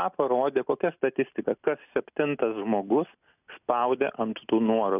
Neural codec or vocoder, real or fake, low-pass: none; real; 3.6 kHz